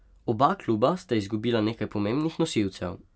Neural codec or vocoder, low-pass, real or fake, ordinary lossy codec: none; none; real; none